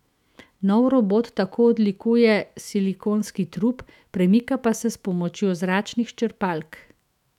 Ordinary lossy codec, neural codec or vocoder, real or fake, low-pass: none; autoencoder, 48 kHz, 128 numbers a frame, DAC-VAE, trained on Japanese speech; fake; 19.8 kHz